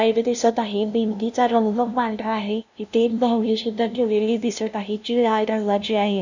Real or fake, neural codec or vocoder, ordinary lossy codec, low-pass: fake; codec, 16 kHz, 0.5 kbps, FunCodec, trained on LibriTTS, 25 frames a second; none; 7.2 kHz